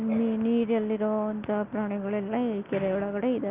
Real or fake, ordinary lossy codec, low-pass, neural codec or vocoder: real; Opus, 32 kbps; 3.6 kHz; none